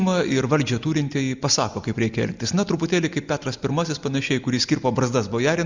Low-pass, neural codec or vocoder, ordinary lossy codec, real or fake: 7.2 kHz; none; Opus, 64 kbps; real